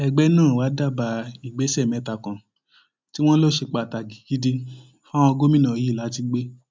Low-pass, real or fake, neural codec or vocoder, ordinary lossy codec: none; real; none; none